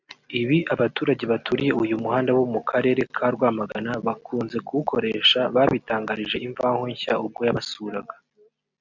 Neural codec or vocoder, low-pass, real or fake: none; 7.2 kHz; real